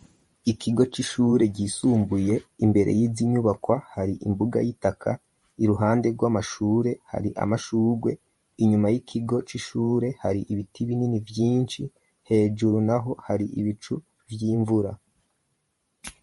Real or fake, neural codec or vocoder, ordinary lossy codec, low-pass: fake; vocoder, 44.1 kHz, 128 mel bands every 512 samples, BigVGAN v2; MP3, 48 kbps; 19.8 kHz